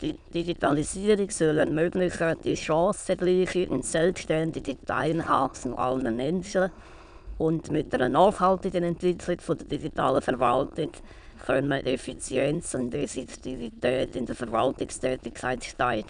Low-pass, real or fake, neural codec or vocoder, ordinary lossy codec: 9.9 kHz; fake; autoencoder, 22.05 kHz, a latent of 192 numbers a frame, VITS, trained on many speakers; none